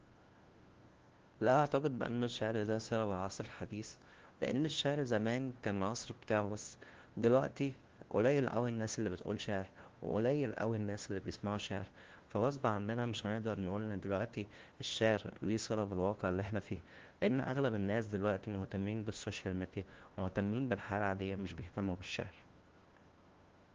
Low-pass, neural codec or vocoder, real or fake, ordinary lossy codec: 7.2 kHz; codec, 16 kHz, 1 kbps, FunCodec, trained on LibriTTS, 50 frames a second; fake; Opus, 16 kbps